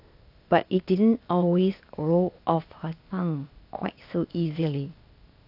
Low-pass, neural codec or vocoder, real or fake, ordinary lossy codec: 5.4 kHz; codec, 16 kHz, 0.8 kbps, ZipCodec; fake; AAC, 48 kbps